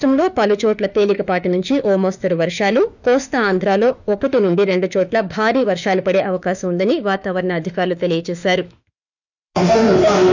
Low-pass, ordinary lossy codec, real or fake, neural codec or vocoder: 7.2 kHz; none; fake; autoencoder, 48 kHz, 32 numbers a frame, DAC-VAE, trained on Japanese speech